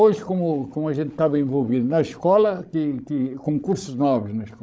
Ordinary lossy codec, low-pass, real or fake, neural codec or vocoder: none; none; fake; codec, 16 kHz, 16 kbps, FreqCodec, larger model